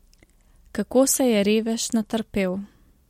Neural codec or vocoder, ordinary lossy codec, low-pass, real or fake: none; MP3, 64 kbps; 19.8 kHz; real